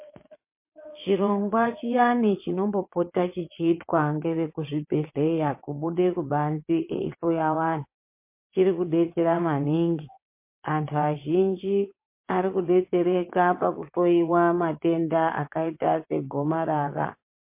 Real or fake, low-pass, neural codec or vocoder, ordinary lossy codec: fake; 3.6 kHz; vocoder, 22.05 kHz, 80 mel bands, WaveNeXt; MP3, 24 kbps